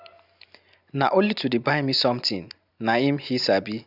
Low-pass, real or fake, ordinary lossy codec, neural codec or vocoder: 5.4 kHz; real; none; none